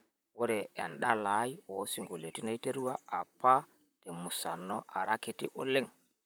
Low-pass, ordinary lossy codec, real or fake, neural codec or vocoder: none; none; fake; vocoder, 44.1 kHz, 128 mel bands, Pupu-Vocoder